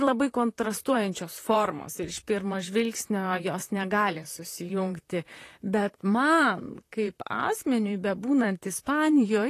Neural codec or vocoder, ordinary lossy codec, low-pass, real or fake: vocoder, 44.1 kHz, 128 mel bands, Pupu-Vocoder; AAC, 48 kbps; 14.4 kHz; fake